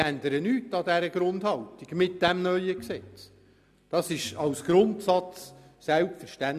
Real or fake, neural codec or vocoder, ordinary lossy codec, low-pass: real; none; none; 14.4 kHz